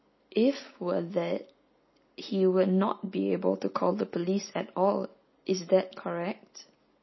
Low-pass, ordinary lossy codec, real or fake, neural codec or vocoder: 7.2 kHz; MP3, 24 kbps; real; none